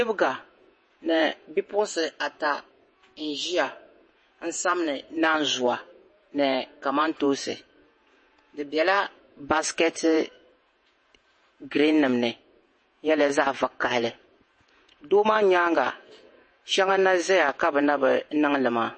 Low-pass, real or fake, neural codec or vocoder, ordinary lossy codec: 9.9 kHz; fake; vocoder, 48 kHz, 128 mel bands, Vocos; MP3, 32 kbps